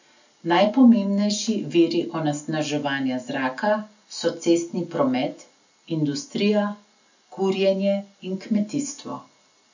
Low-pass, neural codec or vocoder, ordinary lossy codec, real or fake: 7.2 kHz; none; none; real